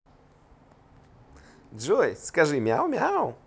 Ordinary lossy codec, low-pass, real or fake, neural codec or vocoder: none; none; real; none